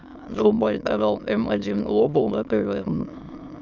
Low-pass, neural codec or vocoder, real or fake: 7.2 kHz; autoencoder, 22.05 kHz, a latent of 192 numbers a frame, VITS, trained on many speakers; fake